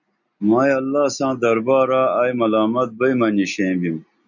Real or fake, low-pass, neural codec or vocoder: real; 7.2 kHz; none